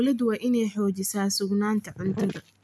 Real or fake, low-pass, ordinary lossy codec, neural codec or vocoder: real; none; none; none